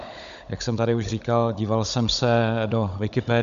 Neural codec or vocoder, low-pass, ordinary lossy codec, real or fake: codec, 16 kHz, 16 kbps, FunCodec, trained on Chinese and English, 50 frames a second; 7.2 kHz; AAC, 96 kbps; fake